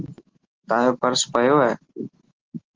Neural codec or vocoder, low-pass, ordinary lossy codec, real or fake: none; 7.2 kHz; Opus, 16 kbps; real